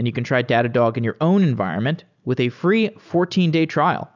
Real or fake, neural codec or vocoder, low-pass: real; none; 7.2 kHz